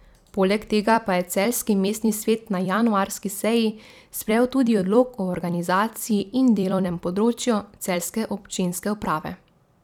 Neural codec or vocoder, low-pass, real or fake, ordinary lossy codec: vocoder, 44.1 kHz, 128 mel bands every 256 samples, BigVGAN v2; 19.8 kHz; fake; none